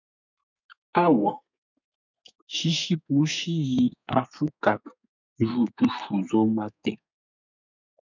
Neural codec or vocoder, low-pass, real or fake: codec, 32 kHz, 1.9 kbps, SNAC; 7.2 kHz; fake